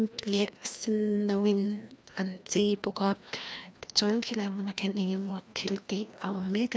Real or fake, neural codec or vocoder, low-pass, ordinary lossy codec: fake; codec, 16 kHz, 1 kbps, FreqCodec, larger model; none; none